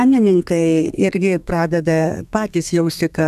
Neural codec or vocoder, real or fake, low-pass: codec, 32 kHz, 1.9 kbps, SNAC; fake; 14.4 kHz